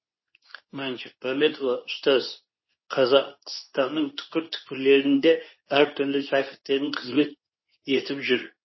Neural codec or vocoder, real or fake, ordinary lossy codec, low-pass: codec, 24 kHz, 0.9 kbps, WavTokenizer, medium speech release version 2; fake; MP3, 24 kbps; 7.2 kHz